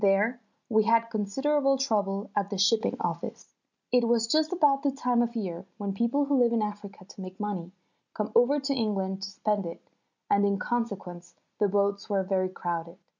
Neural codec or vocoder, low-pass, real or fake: none; 7.2 kHz; real